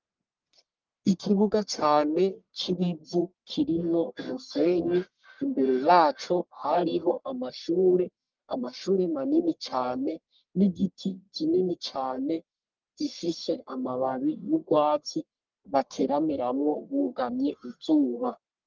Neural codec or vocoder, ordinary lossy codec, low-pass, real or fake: codec, 44.1 kHz, 1.7 kbps, Pupu-Codec; Opus, 32 kbps; 7.2 kHz; fake